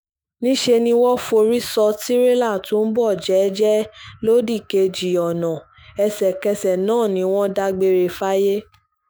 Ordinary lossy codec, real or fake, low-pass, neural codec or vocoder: none; fake; none; autoencoder, 48 kHz, 128 numbers a frame, DAC-VAE, trained on Japanese speech